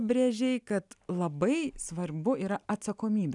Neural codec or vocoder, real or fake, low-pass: none; real; 10.8 kHz